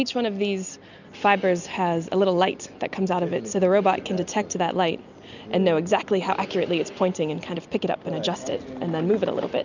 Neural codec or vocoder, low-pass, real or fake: none; 7.2 kHz; real